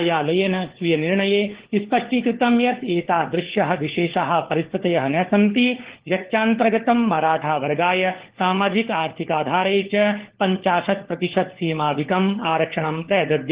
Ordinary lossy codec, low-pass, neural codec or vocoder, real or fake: Opus, 16 kbps; 3.6 kHz; codec, 16 kHz, 4 kbps, FunCodec, trained on LibriTTS, 50 frames a second; fake